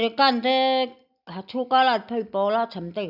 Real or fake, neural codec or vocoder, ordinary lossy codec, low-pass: real; none; none; 5.4 kHz